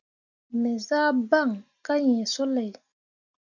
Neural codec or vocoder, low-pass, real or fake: none; 7.2 kHz; real